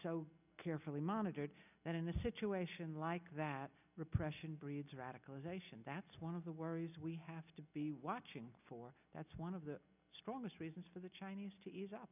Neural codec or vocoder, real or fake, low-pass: none; real; 3.6 kHz